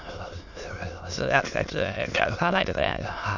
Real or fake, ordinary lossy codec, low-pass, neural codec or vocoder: fake; none; 7.2 kHz; autoencoder, 22.05 kHz, a latent of 192 numbers a frame, VITS, trained on many speakers